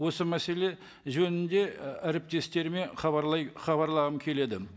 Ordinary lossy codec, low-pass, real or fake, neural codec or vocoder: none; none; real; none